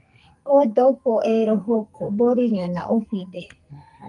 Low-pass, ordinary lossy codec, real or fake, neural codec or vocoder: 10.8 kHz; Opus, 32 kbps; fake; codec, 44.1 kHz, 2.6 kbps, SNAC